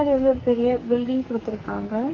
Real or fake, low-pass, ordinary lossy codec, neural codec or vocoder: fake; 7.2 kHz; Opus, 32 kbps; codec, 44.1 kHz, 2.6 kbps, SNAC